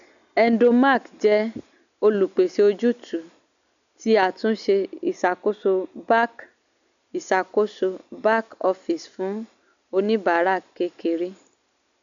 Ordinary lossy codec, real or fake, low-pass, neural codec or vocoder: none; real; 7.2 kHz; none